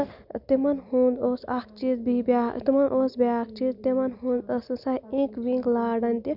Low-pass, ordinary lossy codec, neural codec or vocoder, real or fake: 5.4 kHz; none; none; real